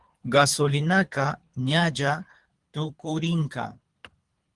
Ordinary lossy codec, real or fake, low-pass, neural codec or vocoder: Opus, 24 kbps; fake; 10.8 kHz; codec, 24 kHz, 3 kbps, HILCodec